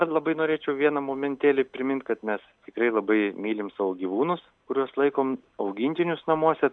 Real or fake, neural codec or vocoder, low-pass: real; none; 9.9 kHz